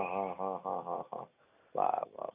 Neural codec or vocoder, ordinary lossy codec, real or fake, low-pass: none; none; real; 3.6 kHz